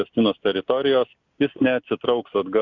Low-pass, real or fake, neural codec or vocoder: 7.2 kHz; real; none